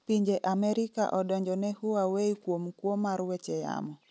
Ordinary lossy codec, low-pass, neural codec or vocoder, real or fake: none; none; none; real